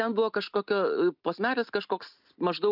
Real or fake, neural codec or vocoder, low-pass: real; none; 5.4 kHz